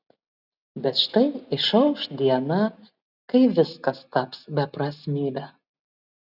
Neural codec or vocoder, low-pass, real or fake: none; 5.4 kHz; real